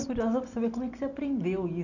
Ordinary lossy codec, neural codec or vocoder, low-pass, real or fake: none; none; 7.2 kHz; real